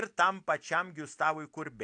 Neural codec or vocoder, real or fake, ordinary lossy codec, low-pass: none; real; AAC, 64 kbps; 9.9 kHz